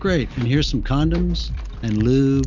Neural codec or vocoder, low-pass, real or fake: none; 7.2 kHz; real